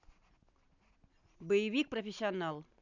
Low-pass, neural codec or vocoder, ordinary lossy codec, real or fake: 7.2 kHz; none; none; real